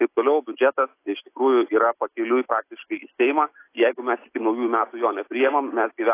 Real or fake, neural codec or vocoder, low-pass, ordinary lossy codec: real; none; 3.6 kHz; AAC, 24 kbps